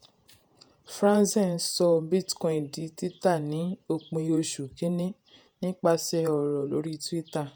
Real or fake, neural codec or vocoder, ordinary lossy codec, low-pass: fake; vocoder, 48 kHz, 128 mel bands, Vocos; none; none